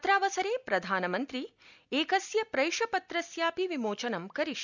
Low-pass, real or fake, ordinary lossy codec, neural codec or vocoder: 7.2 kHz; real; none; none